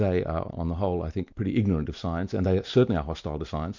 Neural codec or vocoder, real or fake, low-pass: none; real; 7.2 kHz